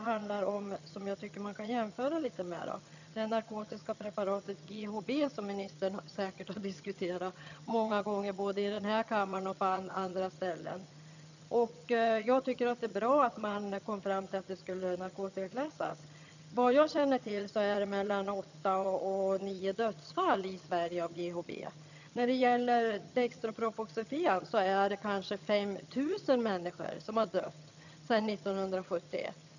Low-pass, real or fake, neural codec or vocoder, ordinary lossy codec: 7.2 kHz; fake; vocoder, 22.05 kHz, 80 mel bands, HiFi-GAN; none